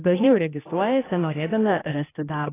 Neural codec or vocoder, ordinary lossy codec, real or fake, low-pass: codec, 16 kHz, 1 kbps, X-Codec, HuBERT features, trained on general audio; AAC, 16 kbps; fake; 3.6 kHz